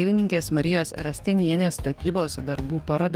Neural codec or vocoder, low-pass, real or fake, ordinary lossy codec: codec, 44.1 kHz, 2.6 kbps, DAC; 19.8 kHz; fake; Opus, 32 kbps